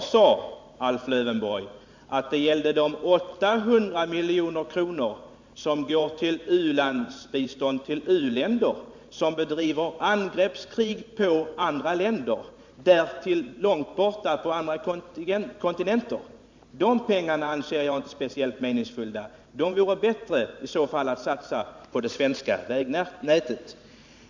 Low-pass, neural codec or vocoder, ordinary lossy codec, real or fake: 7.2 kHz; vocoder, 44.1 kHz, 128 mel bands every 512 samples, BigVGAN v2; MP3, 64 kbps; fake